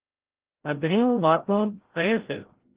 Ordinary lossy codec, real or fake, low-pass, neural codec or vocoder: Opus, 16 kbps; fake; 3.6 kHz; codec, 16 kHz, 0.5 kbps, FreqCodec, larger model